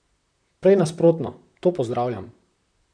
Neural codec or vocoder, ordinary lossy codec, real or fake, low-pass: vocoder, 44.1 kHz, 128 mel bands, Pupu-Vocoder; none; fake; 9.9 kHz